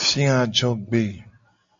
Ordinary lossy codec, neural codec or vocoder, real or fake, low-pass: MP3, 64 kbps; none; real; 7.2 kHz